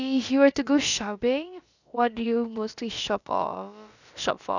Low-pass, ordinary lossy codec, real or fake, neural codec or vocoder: 7.2 kHz; none; fake; codec, 16 kHz, about 1 kbps, DyCAST, with the encoder's durations